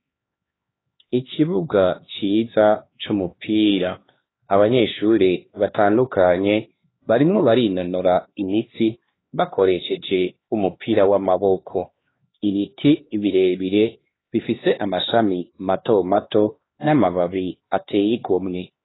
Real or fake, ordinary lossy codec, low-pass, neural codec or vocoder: fake; AAC, 16 kbps; 7.2 kHz; codec, 16 kHz, 2 kbps, X-Codec, HuBERT features, trained on LibriSpeech